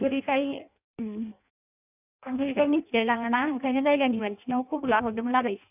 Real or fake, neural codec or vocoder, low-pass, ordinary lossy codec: fake; codec, 16 kHz in and 24 kHz out, 0.6 kbps, FireRedTTS-2 codec; 3.6 kHz; none